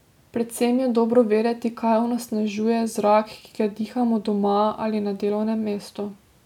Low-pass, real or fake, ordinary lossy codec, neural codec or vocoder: 19.8 kHz; real; none; none